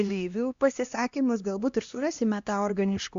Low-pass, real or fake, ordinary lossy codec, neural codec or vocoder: 7.2 kHz; fake; AAC, 64 kbps; codec, 16 kHz, 1 kbps, X-Codec, HuBERT features, trained on LibriSpeech